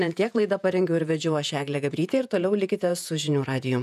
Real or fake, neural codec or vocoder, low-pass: fake; vocoder, 48 kHz, 128 mel bands, Vocos; 14.4 kHz